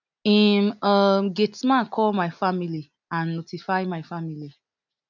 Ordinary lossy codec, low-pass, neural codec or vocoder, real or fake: none; 7.2 kHz; none; real